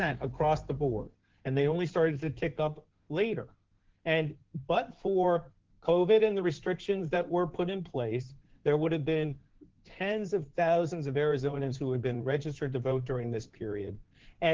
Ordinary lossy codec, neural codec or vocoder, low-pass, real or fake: Opus, 16 kbps; codec, 16 kHz in and 24 kHz out, 2.2 kbps, FireRedTTS-2 codec; 7.2 kHz; fake